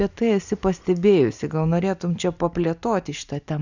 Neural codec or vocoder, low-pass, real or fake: none; 7.2 kHz; real